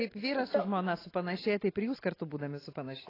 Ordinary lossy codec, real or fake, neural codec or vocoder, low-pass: AAC, 24 kbps; real; none; 5.4 kHz